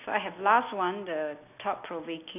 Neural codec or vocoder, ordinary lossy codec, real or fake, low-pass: none; none; real; 3.6 kHz